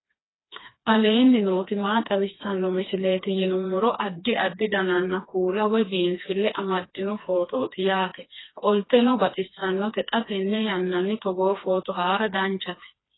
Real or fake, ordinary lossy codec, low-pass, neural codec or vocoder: fake; AAC, 16 kbps; 7.2 kHz; codec, 16 kHz, 2 kbps, FreqCodec, smaller model